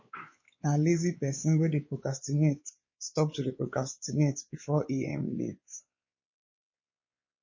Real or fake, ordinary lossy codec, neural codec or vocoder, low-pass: fake; MP3, 32 kbps; codec, 16 kHz, 4 kbps, X-Codec, WavLM features, trained on Multilingual LibriSpeech; 7.2 kHz